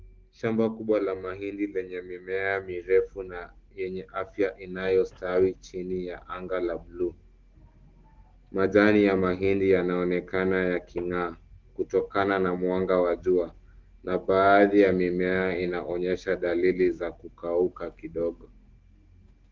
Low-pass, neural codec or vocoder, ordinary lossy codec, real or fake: 7.2 kHz; none; Opus, 16 kbps; real